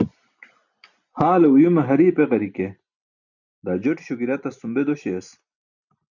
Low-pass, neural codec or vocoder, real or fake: 7.2 kHz; none; real